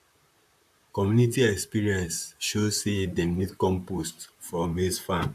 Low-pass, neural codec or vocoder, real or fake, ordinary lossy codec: 14.4 kHz; vocoder, 44.1 kHz, 128 mel bands, Pupu-Vocoder; fake; AAC, 96 kbps